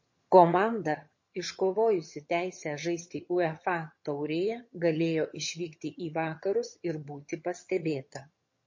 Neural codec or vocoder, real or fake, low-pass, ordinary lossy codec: vocoder, 22.05 kHz, 80 mel bands, HiFi-GAN; fake; 7.2 kHz; MP3, 32 kbps